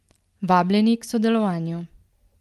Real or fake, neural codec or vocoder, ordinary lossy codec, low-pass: real; none; Opus, 24 kbps; 14.4 kHz